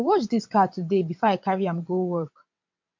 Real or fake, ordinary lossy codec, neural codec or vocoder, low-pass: fake; MP3, 48 kbps; vocoder, 22.05 kHz, 80 mel bands, WaveNeXt; 7.2 kHz